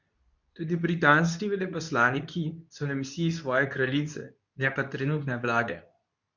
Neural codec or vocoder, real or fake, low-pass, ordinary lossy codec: codec, 24 kHz, 0.9 kbps, WavTokenizer, medium speech release version 2; fake; 7.2 kHz; none